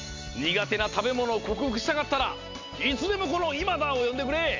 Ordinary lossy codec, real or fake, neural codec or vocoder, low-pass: MP3, 64 kbps; real; none; 7.2 kHz